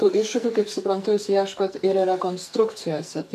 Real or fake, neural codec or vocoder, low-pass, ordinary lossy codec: fake; codec, 44.1 kHz, 2.6 kbps, SNAC; 14.4 kHz; MP3, 96 kbps